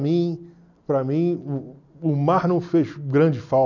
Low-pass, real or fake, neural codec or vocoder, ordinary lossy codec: 7.2 kHz; real; none; none